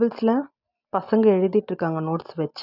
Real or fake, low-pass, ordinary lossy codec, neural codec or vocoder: real; 5.4 kHz; none; none